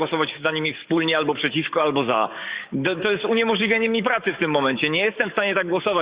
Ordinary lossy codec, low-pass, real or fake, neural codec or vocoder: Opus, 64 kbps; 3.6 kHz; fake; codec, 44.1 kHz, 7.8 kbps, DAC